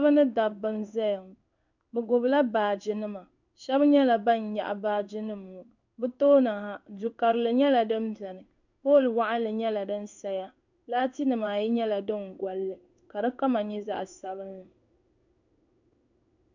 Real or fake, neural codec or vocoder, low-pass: fake; codec, 16 kHz in and 24 kHz out, 1 kbps, XY-Tokenizer; 7.2 kHz